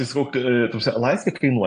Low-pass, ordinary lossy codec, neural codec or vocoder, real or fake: 9.9 kHz; AAC, 48 kbps; none; real